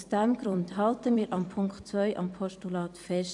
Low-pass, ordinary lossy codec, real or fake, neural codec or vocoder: 10.8 kHz; AAC, 64 kbps; fake; vocoder, 44.1 kHz, 128 mel bands every 256 samples, BigVGAN v2